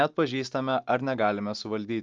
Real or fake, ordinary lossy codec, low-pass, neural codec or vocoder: real; Opus, 24 kbps; 7.2 kHz; none